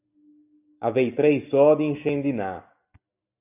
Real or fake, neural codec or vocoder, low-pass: real; none; 3.6 kHz